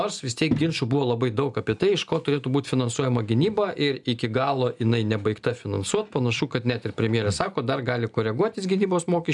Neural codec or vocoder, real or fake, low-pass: none; real; 10.8 kHz